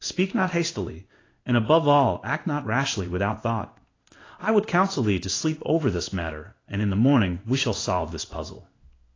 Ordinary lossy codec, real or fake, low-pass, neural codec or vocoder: AAC, 32 kbps; fake; 7.2 kHz; codec, 16 kHz in and 24 kHz out, 1 kbps, XY-Tokenizer